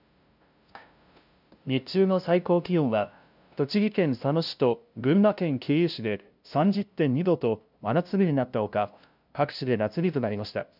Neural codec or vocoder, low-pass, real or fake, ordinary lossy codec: codec, 16 kHz, 0.5 kbps, FunCodec, trained on LibriTTS, 25 frames a second; 5.4 kHz; fake; none